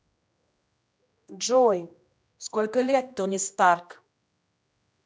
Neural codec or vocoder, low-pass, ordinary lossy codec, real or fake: codec, 16 kHz, 1 kbps, X-Codec, HuBERT features, trained on general audio; none; none; fake